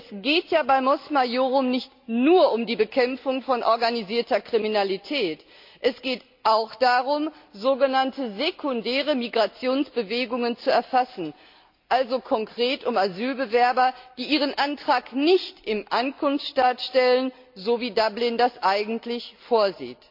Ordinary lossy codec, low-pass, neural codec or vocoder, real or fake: MP3, 48 kbps; 5.4 kHz; none; real